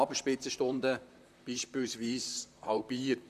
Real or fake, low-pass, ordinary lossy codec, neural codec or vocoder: fake; 14.4 kHz; none; vocoder, 44.1 kHz, 128 mel bands, Pupu-Vocoder